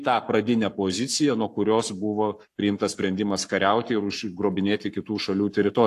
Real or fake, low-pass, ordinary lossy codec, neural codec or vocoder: fake; 14.4 kHz; AAC, 64 kbps; codec, 44.1 kHz, 7.8 kbps, Pupu-Codec